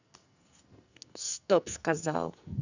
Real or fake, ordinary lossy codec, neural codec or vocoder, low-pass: fake; none; codec, 44.1 kHz, 2.6 kbps, SNAC; 7.2 kHz